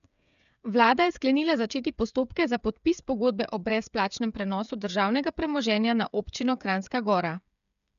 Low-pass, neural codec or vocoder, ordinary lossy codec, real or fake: 7.2 kHz; codec, 16 kHz, 8 kbps, FreqCodec, smaller model; none; fake